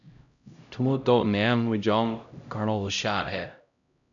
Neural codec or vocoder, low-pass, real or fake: codec, 16 kHz, 0.5 kbps, X-Codec, HuBERT features, trained on LibriSpeech; 7.2 kHz; fake